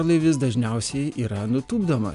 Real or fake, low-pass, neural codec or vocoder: real; 10.8 kHz; none